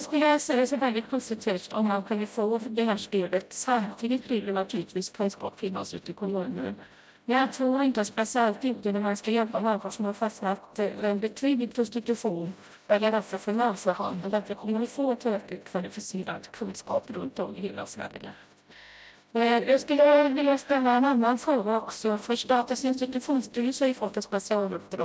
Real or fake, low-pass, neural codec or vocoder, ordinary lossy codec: fake; none; codec, 16 kHz, 0.5 kbps, FreqCodec, smaller model; none